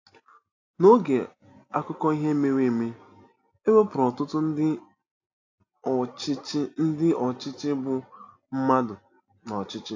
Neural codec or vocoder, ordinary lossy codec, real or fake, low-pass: none; AAC, 48 kbps; real; 7.2 kHz